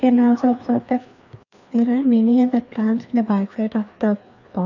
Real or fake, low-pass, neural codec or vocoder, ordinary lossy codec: fake; 7.2 kHz; codec, 16 kHz, 2 kbps, FreqCodec, larger model; none